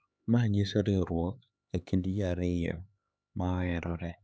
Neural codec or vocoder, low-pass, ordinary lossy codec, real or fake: codec, 16 kHz, 4 kbps, X-Codec, HuBERT features, trained on LibriSpeech; none; none; fake